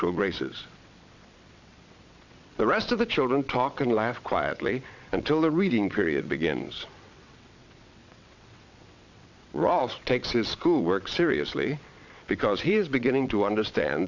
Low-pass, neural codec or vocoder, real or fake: 7.2 kHz; vocoder, 22.05 kHz, 80 mel bands, WaveNeXt; fake